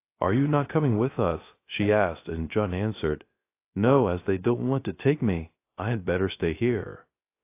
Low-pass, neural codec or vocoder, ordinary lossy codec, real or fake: 3.6 kHz; codec, 16 kHz, 0.3 kbps, FocalCodec; AAC, 24 kbps; fake